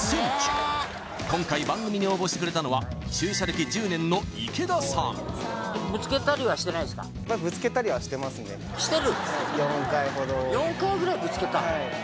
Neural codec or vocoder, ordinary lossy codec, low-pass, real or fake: none; none; none; real